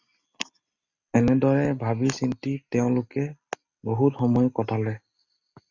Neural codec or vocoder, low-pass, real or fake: none; 7.2 kHz; real